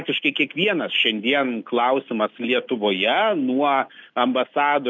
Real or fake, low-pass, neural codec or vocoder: real; 7.2 kHz; none